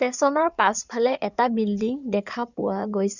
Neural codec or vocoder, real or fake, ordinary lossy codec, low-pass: codec, 16 kHz in and 24 kHz out, 2.2 kbps, FireRedTTS-2 codec; fake; none; 7.2 kHz